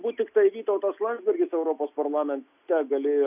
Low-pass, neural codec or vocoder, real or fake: 3.6 kHz; none; real